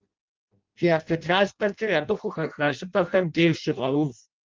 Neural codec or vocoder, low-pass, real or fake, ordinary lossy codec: codec, 16 kHz in and 24 kHz out, 0.6 kbps, FireRedTTS-2 codec; 7.2 kHz; fake; Opus, 32 kbps